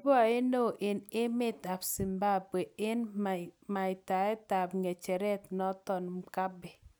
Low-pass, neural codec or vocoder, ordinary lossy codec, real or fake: none; none; none; real